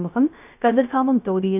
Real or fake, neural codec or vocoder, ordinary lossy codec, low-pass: fake; codec, 16 kHz, 0.3 kbps, FocalCodec; none; 3.6 kHz